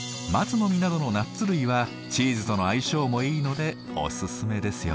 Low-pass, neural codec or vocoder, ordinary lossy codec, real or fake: none; none; none; real